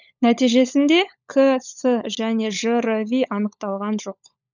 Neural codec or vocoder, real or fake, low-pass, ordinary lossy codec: codec, 16 kHz, 16 kbps, FunCodec, trained on LibriTTS, 50 frames a second; fake; 7.2 kHz; none